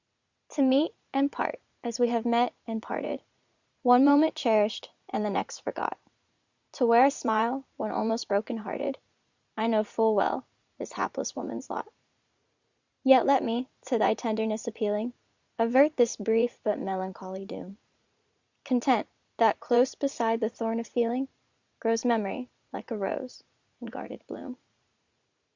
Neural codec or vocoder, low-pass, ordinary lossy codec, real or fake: vocoder, 44.1 kHz, 80 mel bands, Vocos; 7.2 kHz; Opus, 64 kbps; fake